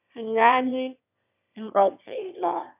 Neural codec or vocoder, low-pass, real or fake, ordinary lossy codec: autoencoder, 22.05 kHz, a latent of 192 numbers a frame, VITS, trained on one speaker; 3.6 kHz; fake; none